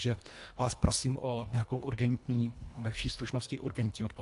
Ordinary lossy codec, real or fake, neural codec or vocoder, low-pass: AAC, 64 kbps; fake; codec, 24 kHz, 1.5 kbps, HILCodec; 10.8 kHz